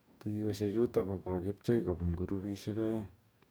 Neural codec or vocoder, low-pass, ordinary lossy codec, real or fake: codec, 44.1 kHz, 2.6 kbps, DAC; none; none; fake